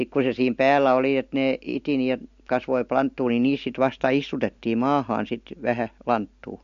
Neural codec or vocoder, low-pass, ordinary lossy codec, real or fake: none; 7.2 kHz; MP3, 48 kbps; real